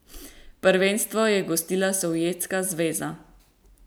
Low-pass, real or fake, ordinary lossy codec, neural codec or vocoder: none; real; none; none